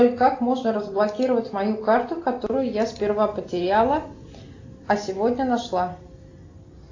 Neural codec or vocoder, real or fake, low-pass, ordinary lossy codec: none; real; 7.2 kHz; AAC, 48 kbps